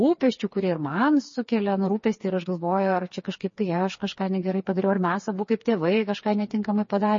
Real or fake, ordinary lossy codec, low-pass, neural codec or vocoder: fake; MP3, 32 kbps; 7.2 kHz; codec, 16 kHz, 4 kbps, FreqCodec, smaller model